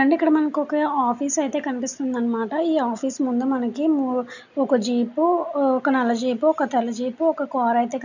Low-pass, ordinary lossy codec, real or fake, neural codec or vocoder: 7.2 kHz; none; real; none